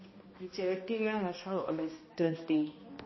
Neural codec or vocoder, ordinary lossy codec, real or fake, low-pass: codec, 16 kHz, 1 kbps, X-Codec, HuBERT features, trained on balanced general audio; MP3, 24 kbps; fake; 7.2 kHz